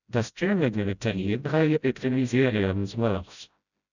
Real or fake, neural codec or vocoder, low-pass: fake; codec, 16 kHz, 0.5 kbps, FreqCodec, smaller model; 7.2 kHz